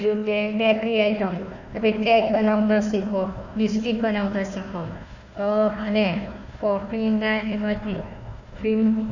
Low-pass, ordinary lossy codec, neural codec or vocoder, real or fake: 7.2 kHz; none; codec, 16 kHz, 1 kbps, FunCodec, trained on Chinese and English, 50 frames a second; fake